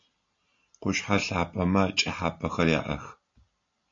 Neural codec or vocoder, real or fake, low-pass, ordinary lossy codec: none; real; 7.2 kHz; MP3, 48 kbps